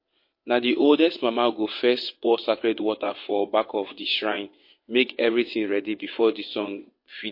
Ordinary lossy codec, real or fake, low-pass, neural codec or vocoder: MP3, 32 kbps; fake; 5.4 kHz; vocoder, 22.05 kHz, 80 mel bands, WaveNeXt